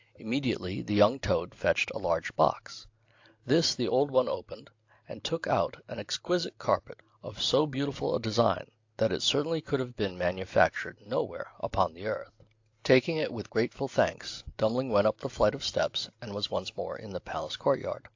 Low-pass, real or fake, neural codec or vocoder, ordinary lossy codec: 7.2 kHz; real; none; AAC, 48 kbps